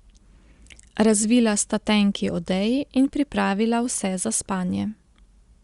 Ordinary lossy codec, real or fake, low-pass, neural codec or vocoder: Opus, 64 kbps; real; 10.8 kHz; none